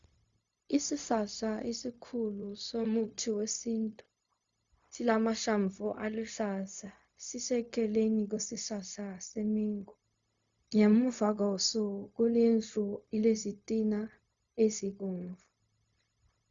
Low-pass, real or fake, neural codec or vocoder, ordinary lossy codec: 7.2 kHz; fake; codec, 16 kHz, 0.4 kbps, LongCat-Audio-Codec; Opus, 64 kbps